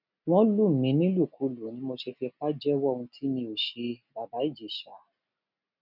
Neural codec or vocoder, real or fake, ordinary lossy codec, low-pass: none; real; none; 5.4 kHz